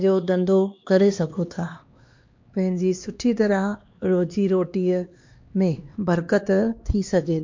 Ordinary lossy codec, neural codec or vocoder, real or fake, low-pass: MP3, 48 kbps; codec, 16 kHz, 2 kbps, X-Codec, HuBERT features, trained on LibriSpeech; fake; 7.2 kHz